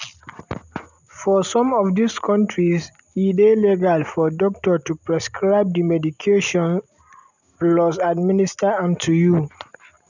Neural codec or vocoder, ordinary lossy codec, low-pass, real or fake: none; none; 7.2 kHz; real